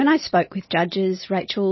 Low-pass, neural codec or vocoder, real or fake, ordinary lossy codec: 7.2 kHz; none; real; MP3, 24 kbps